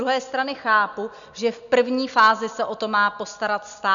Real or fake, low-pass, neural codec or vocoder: real; 7.2 kHz; none